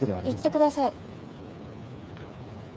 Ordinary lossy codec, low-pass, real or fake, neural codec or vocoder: none; none; fake; codec, 16 kHz, 4 kbps, FreqCodec, smaller model